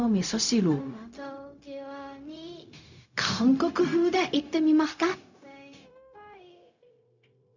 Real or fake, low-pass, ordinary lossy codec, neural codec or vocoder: fake; 7.2 kHz; none; codec, 16 kHz, 0.4 kbps, LongCat-Audio-Codec